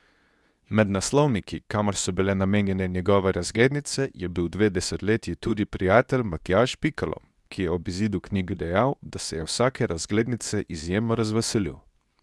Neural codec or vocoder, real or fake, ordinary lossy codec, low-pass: codec, 24 kHz, 0.9 kbps, WavTokenizer, medium speech release version 2; fake; none; none